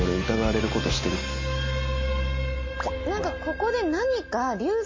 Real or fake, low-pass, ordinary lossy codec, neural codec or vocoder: real; 7.2 kHz; MP3, 32 kbps; none